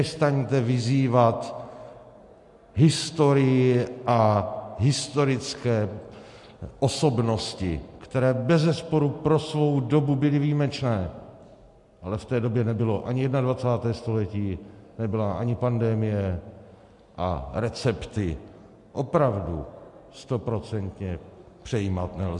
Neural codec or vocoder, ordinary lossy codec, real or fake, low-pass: none; MP3, 64 kbps; real; 10.8 kHz